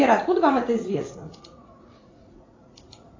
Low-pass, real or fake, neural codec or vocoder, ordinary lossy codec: 7.2 kHz; fake; vocoder, 44.1 kHz, 80 mel bands, Vocos; AAC, 32 kbps